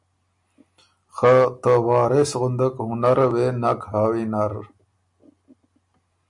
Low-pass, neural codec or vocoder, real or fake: 10.8 kHz; none; real